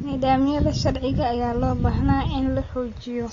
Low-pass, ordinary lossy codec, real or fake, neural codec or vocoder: 7.2 kHz; AAC, 32 kbps; real; none